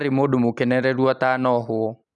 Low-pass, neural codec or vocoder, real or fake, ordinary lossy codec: none; none; real; none